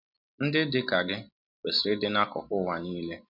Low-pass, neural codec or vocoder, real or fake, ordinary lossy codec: 5.4 kHz; none; real; none